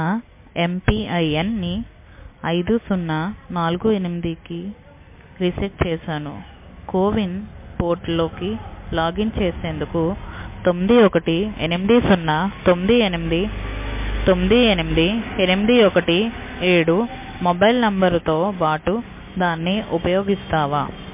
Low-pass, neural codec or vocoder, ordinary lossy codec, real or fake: 3.6 kHz; none; MP3, 24 kbps; real